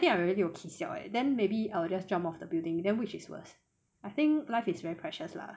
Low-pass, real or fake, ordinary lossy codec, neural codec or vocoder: none; real; none; none